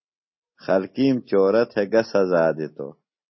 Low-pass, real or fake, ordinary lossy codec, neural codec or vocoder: 7.2 kHz; real; MP3, 24 kbps; none